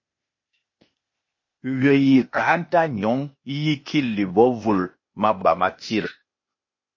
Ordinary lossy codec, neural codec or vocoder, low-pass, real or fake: MP3, 32 kbps; codec, 16 kHz, 0.8 kbps, ZipCodec; 7.2 kHz; fake